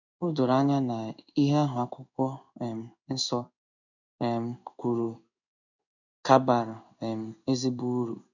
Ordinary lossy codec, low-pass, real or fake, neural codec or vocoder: none; 7.2 kHz; fake; codec, 16 kHz in and 24 kHz out, 1 kbps, XY-Tokenizer